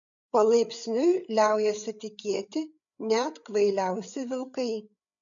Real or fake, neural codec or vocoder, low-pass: fake; codec, 16 kHz, 8 kbps, FreqCodec, larger model; 7.2 kHz